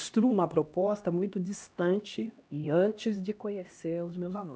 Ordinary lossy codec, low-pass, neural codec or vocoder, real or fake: none; none; codec, 16 kHz, 1 kbps, X-Codec, HuBERT features, trained on LibriSpeech; fake